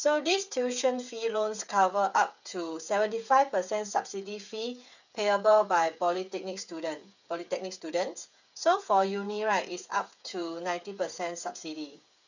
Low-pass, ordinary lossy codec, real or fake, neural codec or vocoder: 7.2 kHz; none; fake; codec, 16 kHz, 8 kbps, FreqCodec, smaller model